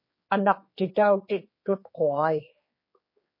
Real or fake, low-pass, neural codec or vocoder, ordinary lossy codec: fake; 5.4 kHz; codec, 16 kHz, 4 kbps, X-Codec, HuBERT features, trained on general audio; MP3, 24 kbps